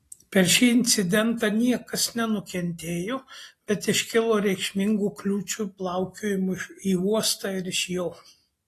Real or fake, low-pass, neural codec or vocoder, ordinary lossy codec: real; 14.4 kHz; none; AAC, 48 kbps